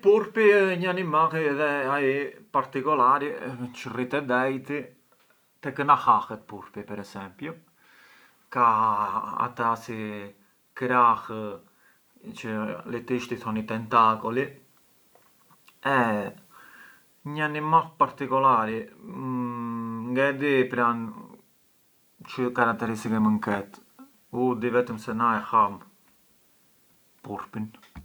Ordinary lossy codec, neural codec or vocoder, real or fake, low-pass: none; none; real; none